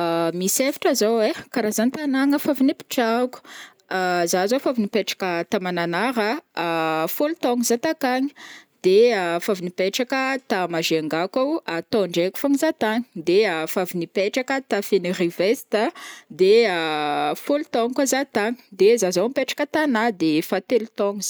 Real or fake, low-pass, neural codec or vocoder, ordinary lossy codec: real; none; none; none